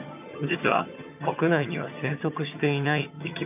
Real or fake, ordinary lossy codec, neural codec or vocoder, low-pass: fake; none; vocoder, 22.05 kHz, 80 mel bands, HiFi-GAN; 3.6 kHz